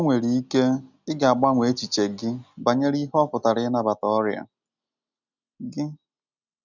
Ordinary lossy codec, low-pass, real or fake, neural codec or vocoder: none; 7.2 kHz; real; none